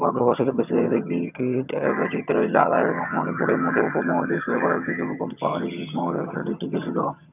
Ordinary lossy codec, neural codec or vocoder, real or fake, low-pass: none; vocoder, 22.05 kHz, 80 mel bands, HiFi-GAN; fake; 3.6 kHz